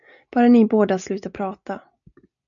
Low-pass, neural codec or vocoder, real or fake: 7.2 kHz; none; real